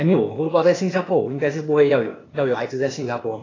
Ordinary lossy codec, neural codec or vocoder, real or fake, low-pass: AAC, 32 kbps; codec, 16 kHz, 0.8 kbps, ZipCodec; fake; 7.2 kHz